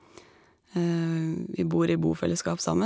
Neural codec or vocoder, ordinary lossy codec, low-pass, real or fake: none; none; none; real